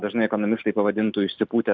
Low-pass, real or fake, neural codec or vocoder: 7.2 kHz; real; none